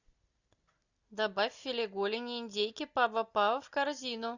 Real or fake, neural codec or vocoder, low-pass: real; none; 7.2 kHz